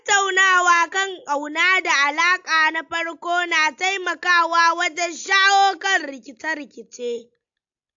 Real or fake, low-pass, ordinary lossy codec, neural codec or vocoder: real; 7.2 kHz; AAC, 64 kbps; none